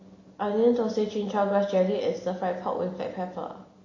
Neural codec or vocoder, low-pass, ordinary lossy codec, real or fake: none; 7.2 kHz; MP3, 32 kbps; real